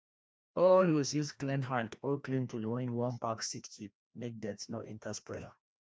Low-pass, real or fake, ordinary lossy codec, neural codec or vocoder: none; fake; none; codec, 16 kHz, 1 kbps, FreqCodec, larger model